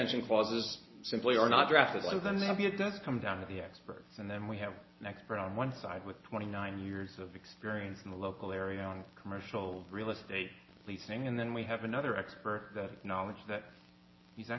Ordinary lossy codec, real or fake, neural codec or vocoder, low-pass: MP3, 24 kbps; real; none; 7.2 kHz